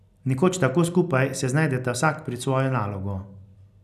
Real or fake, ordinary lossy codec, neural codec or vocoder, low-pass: real; none; none; 14.4 kHz